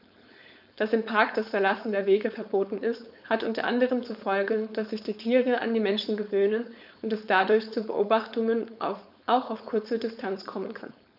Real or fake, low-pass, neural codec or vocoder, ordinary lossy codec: fake; 5.4 kHz; codec, 16 kHz, 4.8 kbps, FACodec; none